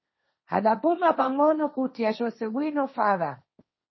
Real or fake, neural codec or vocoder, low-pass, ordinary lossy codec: fake; codec, 16 kHz, 1.1 kbps, Voila-Tokenizer; 7.2 kHz; MP3, 24 kbps